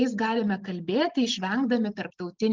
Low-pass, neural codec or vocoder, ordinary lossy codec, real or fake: 7.2 kHz; none; Opus, 24 kbps; real